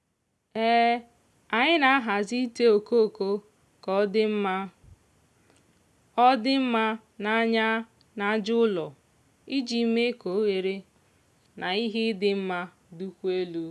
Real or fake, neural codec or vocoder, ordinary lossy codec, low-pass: real; none; none; none